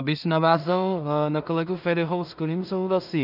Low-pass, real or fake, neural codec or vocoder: 5.4 kHz; fake; codec, 16 kHz in and 24 kHz out, 0.4 kbps, LongCat-Audio-Codec, two codebook decoder